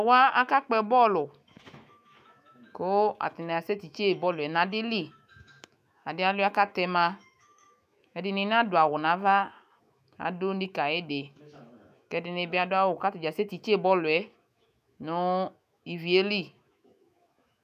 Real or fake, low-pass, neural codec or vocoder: fake; 14.4 kHz; autoencoder, 48 kHz, 128 numbers a frame, DAC-VAE, trained on Japanese speech